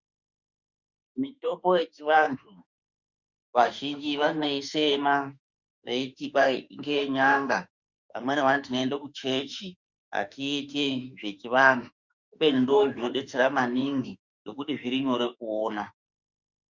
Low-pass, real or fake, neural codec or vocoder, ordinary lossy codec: 7.2 kHz; fake; autoencoder, 48 kHz, 32 numbers a frame, DAC-VAE, trained on Japanese speech; Opus, 64 kbps